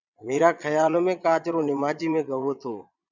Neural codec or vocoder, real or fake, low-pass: vocoder, 22.05 kHz, 80 mel bands, Vocos; fake; 7.2 kHz